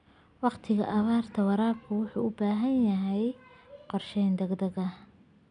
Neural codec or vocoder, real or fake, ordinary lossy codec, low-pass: none; real; none; 9.9 kHz